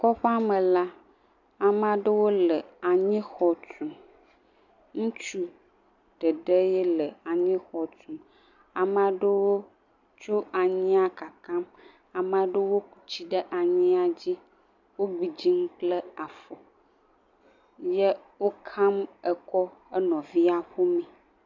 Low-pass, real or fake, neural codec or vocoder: 7.2 kHz; real; none